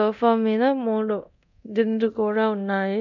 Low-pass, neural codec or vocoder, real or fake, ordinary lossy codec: 7.2 kHz; codec, 24 kHz, 0.9 kbps, DualCodec; fake; none